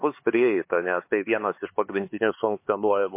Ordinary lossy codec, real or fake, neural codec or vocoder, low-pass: MP3, 24 kbps; fake; codec, 16 kHz, 4 kbps, X-Codec, HuBERT features, trained on LibriSpeech; 3.6 kHz